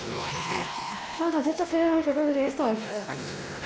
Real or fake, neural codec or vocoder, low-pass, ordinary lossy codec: fake; codec, 16 kHz, 1 kbps, X-Codec, WavLM features, trained on Multilingual LibriSpeech; none; none